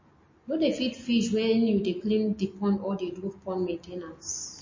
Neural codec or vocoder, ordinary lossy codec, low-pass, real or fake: none; MP3, 32 kbps; 7.2 kHz; real